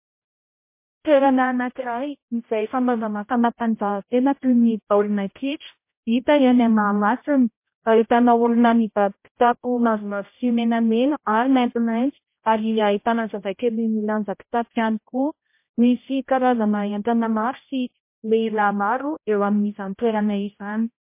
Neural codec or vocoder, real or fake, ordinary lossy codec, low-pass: codec, 16 kHz, 0.5 kbps, X-Codec, HuBERT features, trained on general audio; fake; MP3, 24 kbps; 3.6 kHz